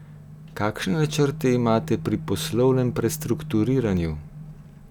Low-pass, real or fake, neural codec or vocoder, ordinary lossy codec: 19.8 kHz; real; none; none